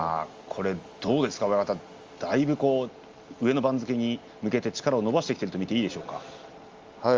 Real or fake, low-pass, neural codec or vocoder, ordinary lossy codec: real; 7.2 kHz; none; Opus, 32 kbps